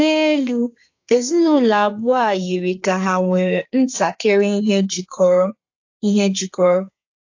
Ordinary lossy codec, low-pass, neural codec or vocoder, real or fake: AAC, 48 kbps; 7.2 kHz; codec, 32 kHz, 1.9 kbps, SNAC; fake